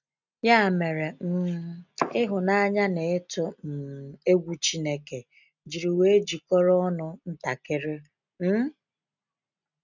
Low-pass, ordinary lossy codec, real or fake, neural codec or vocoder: 7.2 kHz; none; real; none